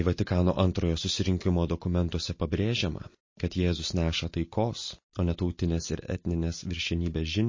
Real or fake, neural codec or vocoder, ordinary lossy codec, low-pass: real; none; MP3, 32 kbps; 7.2 kHz